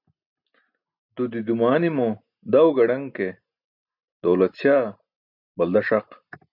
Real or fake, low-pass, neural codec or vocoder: real; 5.4 kHz; none